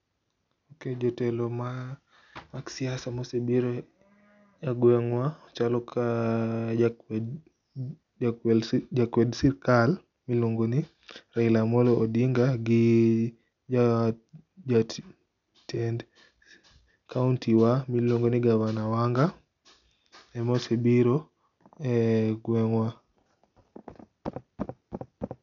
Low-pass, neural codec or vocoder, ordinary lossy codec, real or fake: 7.2 kHz; none; none; real